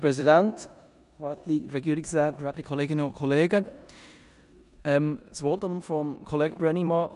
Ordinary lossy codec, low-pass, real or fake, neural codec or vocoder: none; 10.8 kHz; fake; codec, 16 kHz in and 24 kHz out, 0.9 kbps, LongCat-Audio-Codec, four codebook decoder